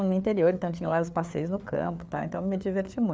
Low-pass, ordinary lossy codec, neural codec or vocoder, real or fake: none; none; codec, 16 kHz, 4 kbps, FreqCodec, larger model; fake